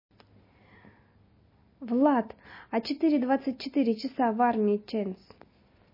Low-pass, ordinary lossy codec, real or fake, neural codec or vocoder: 5.4 kHz; MP3, 24 kbps; real; none